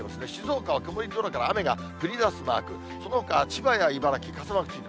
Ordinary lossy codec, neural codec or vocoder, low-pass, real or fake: none; none; none; real